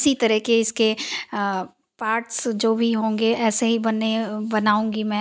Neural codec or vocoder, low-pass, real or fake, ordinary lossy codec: none; none; real; none